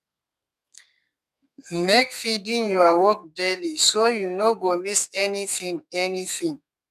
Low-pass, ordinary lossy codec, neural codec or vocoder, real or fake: 14.4 kHz; none; codec, 32 kHz, 1.9 kbps, SNAC; fake